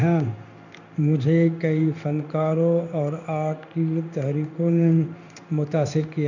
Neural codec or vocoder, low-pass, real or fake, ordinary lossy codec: codec, 16 kHz in and 24 kHz out, 1 kbps, XY-Tokenizer; 7.2 kHz; fake; none